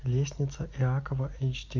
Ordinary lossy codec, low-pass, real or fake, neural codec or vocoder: MP3, 64 kbps; 7.2 kHz; real; none